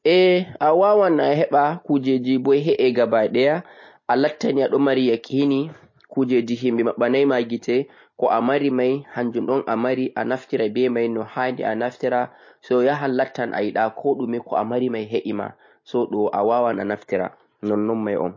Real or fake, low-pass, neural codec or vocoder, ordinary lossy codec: real; 7.2 kHz; none; MP3, 32 kbps